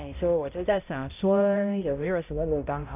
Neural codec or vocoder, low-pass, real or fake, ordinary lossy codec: codec, 16 kHz, 0.5 kbps, X-Codec, HuBERT features, trained on balanced general audio; 3.6 kHz; fake; AAC, 32 kbps